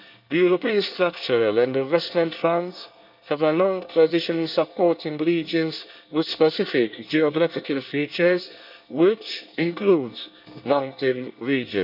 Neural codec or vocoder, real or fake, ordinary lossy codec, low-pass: codec, 24 kHz, 1 kbps, SNAC; fake; none; 5.4 kHz